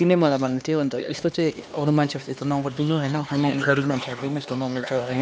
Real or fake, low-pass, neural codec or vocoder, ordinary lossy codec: fake; none; codec, 16 kHz, 2 kbps, X-Codec, HuBERT features, trained on LibriSpeech; none